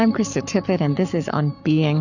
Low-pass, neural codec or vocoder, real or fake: 7.2 kHz; codec, 44.1 kHz, 7.8 kbps, Pupu-Codec; fake